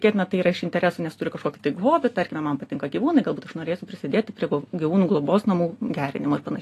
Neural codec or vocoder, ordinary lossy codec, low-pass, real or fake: none; AAC, 48 kbps; 14.4 kHz; real